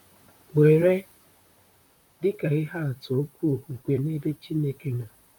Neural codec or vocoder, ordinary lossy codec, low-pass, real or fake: vocoder, 44.1 kHz, 128 mel bands, Pupu-Vocoder; none; 19.8 kHz; fake